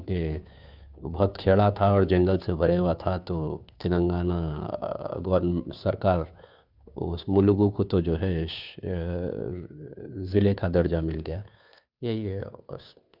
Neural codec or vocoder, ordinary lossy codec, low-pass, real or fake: codec, 16 kHz, 2 kbps, FunCodec, trained on Chinese and English, 25 frames a second; none; 5.4 kHz; fake